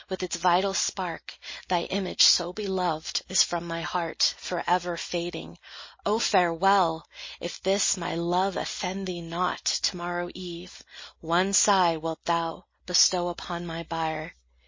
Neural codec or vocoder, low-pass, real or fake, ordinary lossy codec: none; 7.2 kHz; real; MP3, 32 kbps